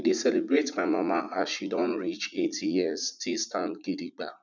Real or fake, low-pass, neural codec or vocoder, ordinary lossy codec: fake; 7.2 kHz; vocoder, 44.1 kHz, 80 mel bands, Vocos; none